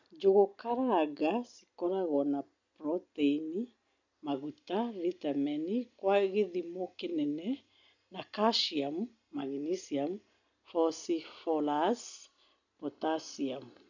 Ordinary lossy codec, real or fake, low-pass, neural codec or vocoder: none; real; 7.2 kHz; none